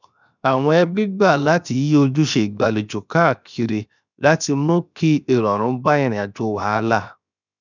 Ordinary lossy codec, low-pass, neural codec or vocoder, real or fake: none; 7.2 kHz; codec, 16 kHz, 0.7 kbps, FocalCodec; fake